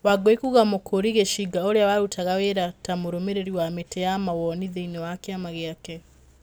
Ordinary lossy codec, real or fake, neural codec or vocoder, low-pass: none; real; none; none